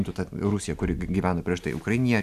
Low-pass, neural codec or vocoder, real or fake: 14.4 kHz; none; real